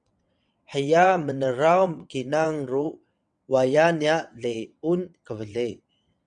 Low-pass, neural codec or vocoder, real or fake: 9.9 kHz; vocoder, 22.05 kHz, 80 mel bands, WaveNeXt; fake